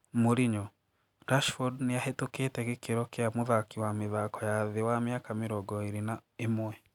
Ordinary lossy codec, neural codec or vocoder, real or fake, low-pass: none; none; real; 19.8 kHz